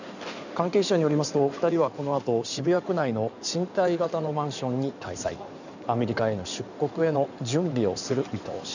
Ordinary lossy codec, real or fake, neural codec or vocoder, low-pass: none; fake; codec, 16 kHz in and 24 kHz out, 2.2 kbps, FireRedTTS-2 codec; 7.2 kHz